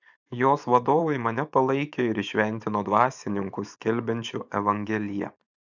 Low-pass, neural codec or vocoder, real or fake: 7.2 kHz; vocoder, 24 kHz, 100 mel bands, Vocos; fake